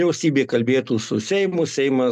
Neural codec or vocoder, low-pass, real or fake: none; 14.4 kHz; real